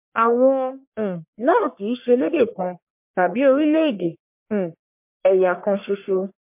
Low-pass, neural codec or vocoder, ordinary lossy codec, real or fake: 3.6 kHz; codec, 44.1 kHz, 1.7 kbps, Pupu-Codec; MP3, 32 kbps; fake